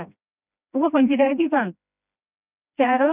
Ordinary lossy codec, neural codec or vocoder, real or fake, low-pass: none; codec, 16 kHz, 1 kbps, FreqCodec, smaller model; fake; 3.6 kHz